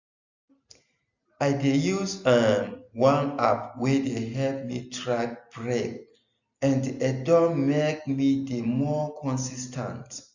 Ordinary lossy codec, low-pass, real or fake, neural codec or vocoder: none; 7.2 kHz; real; none